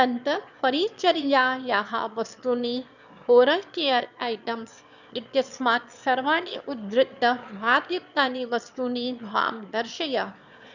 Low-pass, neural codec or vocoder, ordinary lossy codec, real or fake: 7.2 kHz; autoencoder, 22.05 kHz, a latent of 192 numbers a frame, VITS, trained on one speaker; none; fake